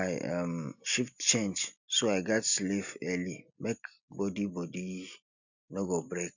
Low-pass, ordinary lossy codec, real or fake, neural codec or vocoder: 7.2 kHz; none; real; none